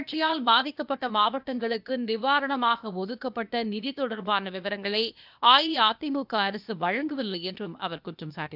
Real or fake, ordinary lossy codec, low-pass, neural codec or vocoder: fake; none; 5.4 kHz; codec, 16 kHz, 0.8 kbps, ZipCodec